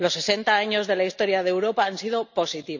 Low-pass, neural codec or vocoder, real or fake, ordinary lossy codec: 7.2 kHz; none; real; none